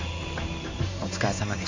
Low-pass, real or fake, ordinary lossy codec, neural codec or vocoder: 7.2 kHz; fake; AAC, 48 kbps; codec, 16 kHz in and 24 kHz out, 1 kbps, XY-Tokenizer